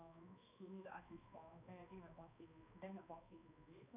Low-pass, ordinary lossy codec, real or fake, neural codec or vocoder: 3.6 kHz; none; fake; codec, 16 kHz, 2 kbps, X-Codec, HuBERT features, trained on balanced general audio